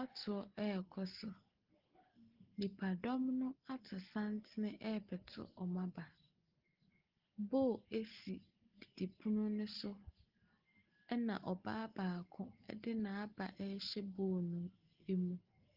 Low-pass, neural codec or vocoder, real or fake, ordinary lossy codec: 5.4 kHz; none; real; Opus, 16 kbps